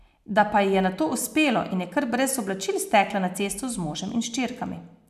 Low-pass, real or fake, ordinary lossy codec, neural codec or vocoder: 14.4 kHz; real; none; none